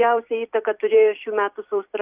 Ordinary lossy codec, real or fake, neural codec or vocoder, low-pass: Opus, 64 kbps; fake; vocoder, 44.1 kHz, 128 mel bands every 256 samples, BigVGAN v2; 3.6 kHz